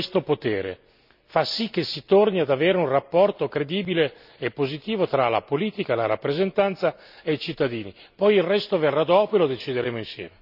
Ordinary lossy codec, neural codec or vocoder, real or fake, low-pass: none; none; real; 5.4 kHz